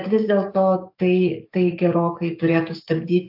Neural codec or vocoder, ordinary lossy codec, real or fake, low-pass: codec, 44.1 kHz, 7.8 kbps, DAC; AAC, 48 kbps; fake; 5.4 kHz